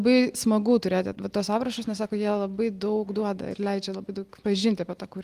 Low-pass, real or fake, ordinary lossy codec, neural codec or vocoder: 14.4 kHz; real; Opus, 24 kbps; none